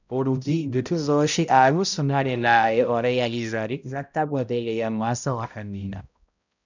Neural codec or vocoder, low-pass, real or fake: codec, 16 kHz, 0.5 kbps, X-Codec, HuBERT features, trained on balanced general audio; 7.2 kHz; fake